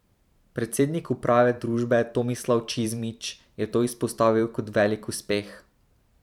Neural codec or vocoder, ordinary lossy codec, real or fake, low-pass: vocoder, 44.1 kHz, 128 mel bands every 512 samples, BigVGAN v2; none; fake; 19.8 kHz